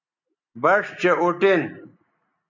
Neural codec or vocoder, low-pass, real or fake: none; 7.2 kHz; real